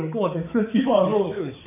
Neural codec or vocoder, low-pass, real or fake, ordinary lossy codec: codec, 16 kHz, 4 kbps, X-Codec, HuBERT features, trained on balanced general audio; 3.6 kHz; fake; none